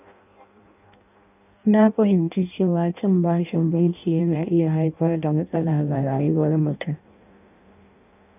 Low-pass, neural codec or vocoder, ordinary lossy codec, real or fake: 3.6 kHz; codec, 16 kHz in and 24 kHz out, 0.6 kbps, FireRedTTS-2 codec; none; fake